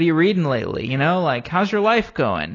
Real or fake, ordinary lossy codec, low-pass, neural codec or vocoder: real; AAC, 32 kbps; 7.2 kHz; none